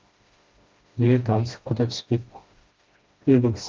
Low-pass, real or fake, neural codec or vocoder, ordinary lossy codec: 7.2 kHz; fake; codec, 16 kHz, 1 kbps, FreqCodec, smaller model; Opus, 24 kbps